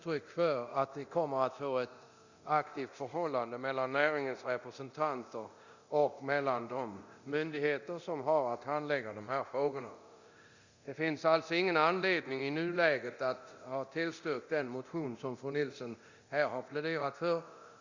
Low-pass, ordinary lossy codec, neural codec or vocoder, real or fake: 7.2 kHz; Opus, 64 kbps; codec, 24 kHz, 0.9 kbps, DualCodec; fake